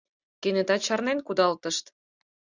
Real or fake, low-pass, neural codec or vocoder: real; 7.2 kHz; none